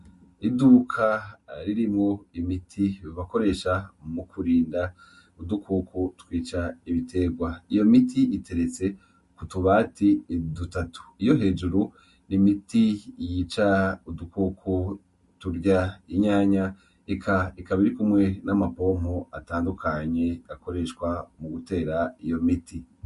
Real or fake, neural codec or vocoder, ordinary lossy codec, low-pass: real; none; MP3, 48 kbps; 14.4 kHz